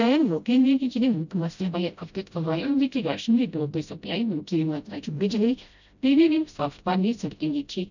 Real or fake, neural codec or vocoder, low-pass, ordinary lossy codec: fake; codec, 16 kHz, 0.5 kbps, FreqCodec, smaller model; 7.2 kHz; none